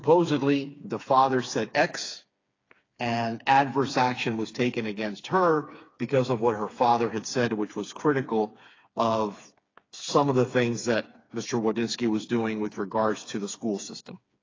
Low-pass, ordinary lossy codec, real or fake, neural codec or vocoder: 7.2 kHz; AAC, 32 kbps; fake; codec, 16 kHz, 4 kbps, FreqCodec, smaller model